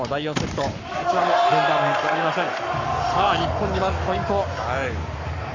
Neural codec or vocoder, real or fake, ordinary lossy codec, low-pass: codec, 44.1 kHz, 7.8 kbps, Pupu-Codec; fake; none; 7.2 kHz